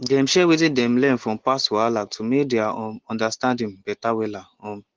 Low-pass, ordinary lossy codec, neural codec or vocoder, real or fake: 7.2 kHz; Opus, 16 kbps; none; real